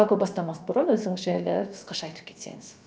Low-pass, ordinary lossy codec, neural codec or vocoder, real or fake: none; none; codec, 16 kHz, about 1 kbps, DyCAST, with the encoder's durations; fake